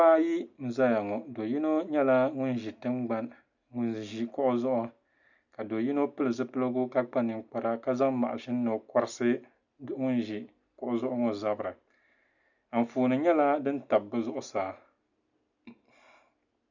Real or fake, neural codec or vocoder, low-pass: real; none; 7.2 kHz